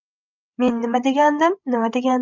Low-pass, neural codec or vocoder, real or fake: 7.2 kHz; codec, 16 kHz, 16 kbps, FreqCodec, larger model; fake